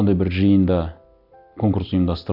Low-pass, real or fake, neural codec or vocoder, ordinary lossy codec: 5.4 kHz; real; none; none